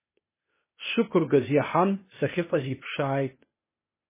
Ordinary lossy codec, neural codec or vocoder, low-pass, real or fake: MP3, 16 kbps; codec, 16 kHz, 0.8 kbps, ZipCodec; 3.6 kHz; fake